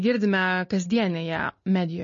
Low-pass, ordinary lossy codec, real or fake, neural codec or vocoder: 7.2 kHz; MP3, 32 kbps; real; none